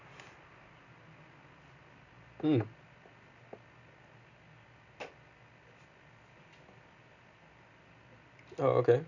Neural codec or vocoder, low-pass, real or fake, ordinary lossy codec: none; 7.2 kHz; real; none